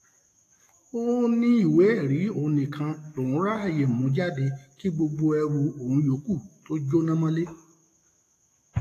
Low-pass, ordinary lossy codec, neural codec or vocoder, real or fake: 14.4 kHz; AAC, 48 kbps; autoencoder, 48 kHz, 128 numbers a frame, DAC-VAE, trained on Japanese speech; fake